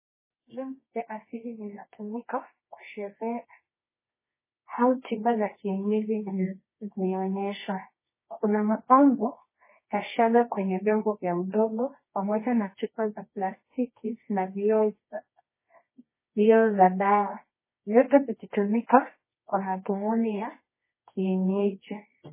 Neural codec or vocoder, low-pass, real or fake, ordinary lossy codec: codec, 24 kHz, 0.9 kbps, WavTokenizer, medium music audio release; 3.6 kHz; fake; MP3, 16 kbps